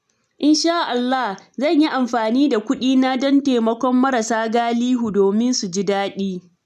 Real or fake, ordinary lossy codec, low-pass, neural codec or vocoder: real; none; 14.4 kHz; none